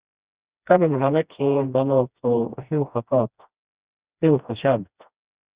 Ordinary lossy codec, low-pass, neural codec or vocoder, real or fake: Opus, 64 kbps; 3.6 kHz; codec, 16 kHz, 1 kbps, FreqCodec, smaller model; fake